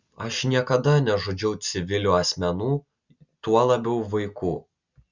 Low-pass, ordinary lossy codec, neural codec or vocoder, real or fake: 7.2 kHz; Opus, 64 kbps; none; real